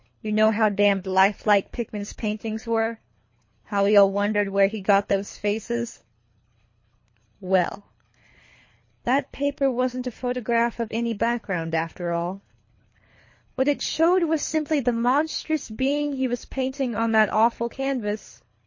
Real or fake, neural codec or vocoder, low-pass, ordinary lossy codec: fake; codec, 24 kHz, 3 kbps, HILCodec; 7.2 kHz; MP3, 32 kbps